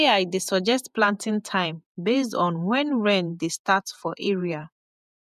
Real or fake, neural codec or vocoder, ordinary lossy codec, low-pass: fake; vocoder, 48 kHz, 128 mel bands, Vocos; none; 14.4 kHz